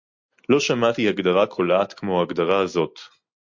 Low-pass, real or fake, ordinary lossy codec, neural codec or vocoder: 7.2 kHz; real; MP3, 48 kbps; none